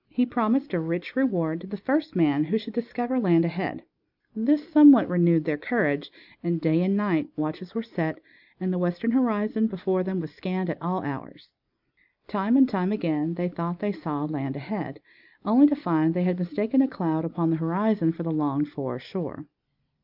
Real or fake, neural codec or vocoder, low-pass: real; none; 5.4 kHz